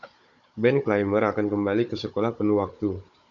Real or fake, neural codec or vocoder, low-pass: fake; codec, 16 kHz, 16 kbps, FunCodec, trained on Chinese and English, 50 frames a second; 7.2 kHz